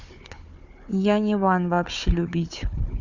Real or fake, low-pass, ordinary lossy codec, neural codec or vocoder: fake; 7.2 kHz; none; codec, 16 kHz, 4 kbps, FunCodec, trained on Chinese and English, 50 frames a second